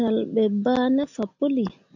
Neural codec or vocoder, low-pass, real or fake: none; 7.2 kHz; real